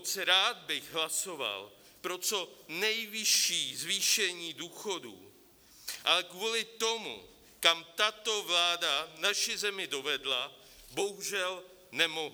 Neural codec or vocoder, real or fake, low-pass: none; real; 19.8 kHz